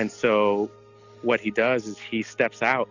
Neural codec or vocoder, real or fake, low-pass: none; real; 7.2 kHz